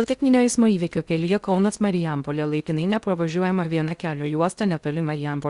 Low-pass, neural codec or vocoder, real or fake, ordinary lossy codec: 10.8 kHz; codec, 16 kHz in and 24 kHz out, 0.6 kbps, FocalCodec, streaming, 2048 codes; fake; Opus, 64 kbps